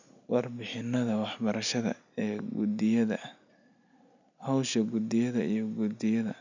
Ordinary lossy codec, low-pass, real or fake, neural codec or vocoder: none; 7.2 kHz; real; none